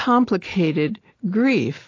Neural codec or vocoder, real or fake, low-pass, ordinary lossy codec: none; real; 7.2 kHz; AAC, 32 kbps